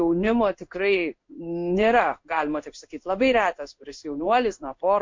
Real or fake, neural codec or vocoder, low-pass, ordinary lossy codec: fake; codec, 16 kHz in and 24 kHz out, 1 kbps, XY-Tokenizer; 7.2 kHz; MP3, 48 kbps